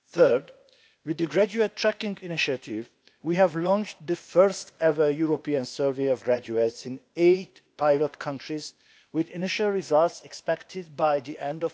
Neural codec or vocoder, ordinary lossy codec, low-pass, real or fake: codec, 16 kHz, 0.8 kbps, ZipCodec; none; none; fake